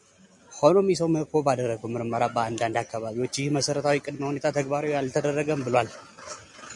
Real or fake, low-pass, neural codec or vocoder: real; 10.8 kHz; none